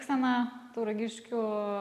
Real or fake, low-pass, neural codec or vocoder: real; 14.4 kHz; none